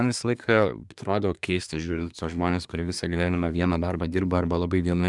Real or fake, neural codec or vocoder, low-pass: fake; codec, 24 kHz, 1 kbps, SNAC; 10.8 kHz